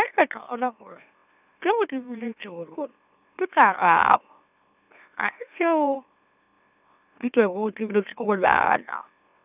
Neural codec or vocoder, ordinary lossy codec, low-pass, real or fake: autoencoder, 44.1 kHz, a latent of 192 numbers a frame, MeloTTS; none; 3.6 kHz; fake